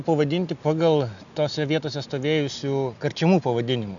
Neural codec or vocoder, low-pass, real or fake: none; 7.2 kHz; real